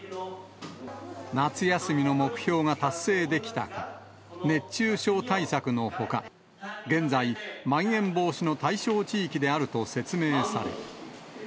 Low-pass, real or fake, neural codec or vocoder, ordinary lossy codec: none; real; none; none